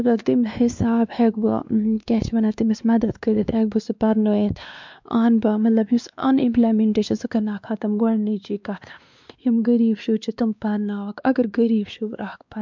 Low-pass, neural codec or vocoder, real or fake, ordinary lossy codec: 7.2 kHz; codec, 16 kHz, 2 kbps, X-Codec, WavLM features, trained on Multilingual LibriSpeech; fake; MP3, 64 kbps